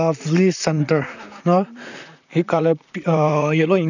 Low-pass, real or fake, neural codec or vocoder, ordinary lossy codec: 7.2 kHz; fake; vocoder, 44.1 kHz, 128 mel bands, Pupu-Vocoder; none